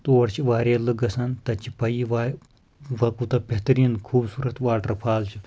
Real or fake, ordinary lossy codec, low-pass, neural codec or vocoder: real; none; none; none